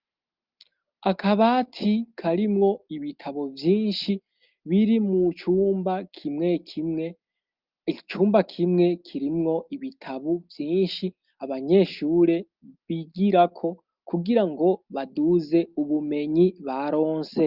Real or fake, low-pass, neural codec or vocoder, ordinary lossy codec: real; 5.4 kHz; none; Opus, 24 kbps